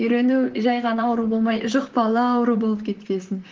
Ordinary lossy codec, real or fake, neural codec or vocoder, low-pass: Opus, 16 kbps; fake; vocoder, 44.1 kHz, 128 mel bands, Pupu-Vocoder; 7.2 kHz